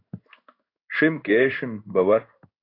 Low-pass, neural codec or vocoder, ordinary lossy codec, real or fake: 5.4 kHz; codec, 16 kHz in and 24 kHz out, 1 kbps, XY-Tokenizer; AAC, 32 kbps; fake